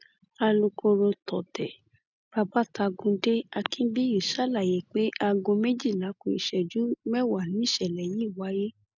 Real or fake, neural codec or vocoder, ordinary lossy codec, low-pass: real; none; none; 7.2 kHz